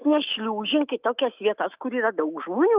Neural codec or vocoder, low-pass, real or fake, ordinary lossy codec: autoencoder, 48 kHz, 128 numbers a frame, DAC-VAE, trained on Japanese speech; 3.6 kHz; fake; Opus, 24 kbps